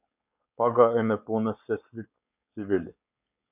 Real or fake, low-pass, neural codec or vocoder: fake; 3.6 kHz; codec, 16 kHz, 4.8 kbps, FACodec